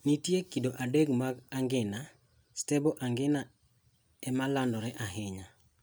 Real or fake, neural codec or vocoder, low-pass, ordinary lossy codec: real; none; none; none